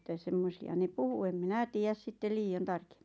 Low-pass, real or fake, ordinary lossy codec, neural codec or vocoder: none; real; none; none